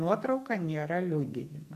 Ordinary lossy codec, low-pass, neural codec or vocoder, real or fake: MP3, 96 kbps; 14.4 kHz; codec, 44.1 kHz, 2.6 kbps, SNAC; fake